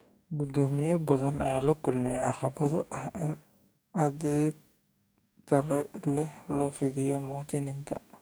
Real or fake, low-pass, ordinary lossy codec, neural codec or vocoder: fake; none; none; codec, 44.1 kHz, 2.6 kbps, DAC